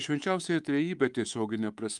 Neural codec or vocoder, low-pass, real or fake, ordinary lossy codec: codec, 24 kHz, 3.1 kbps, DualCodec; 10.8 kHz; fake; Opus, 32 kbps